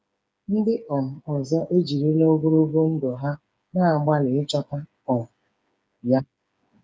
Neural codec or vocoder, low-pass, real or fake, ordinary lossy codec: codec, 16 kHz, 8 kbps, FreqCodec, smaller model; none; fake; none